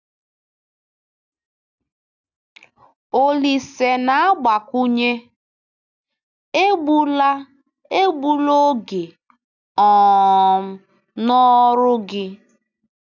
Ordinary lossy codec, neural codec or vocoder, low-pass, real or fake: none; none; 7.2 kHz; real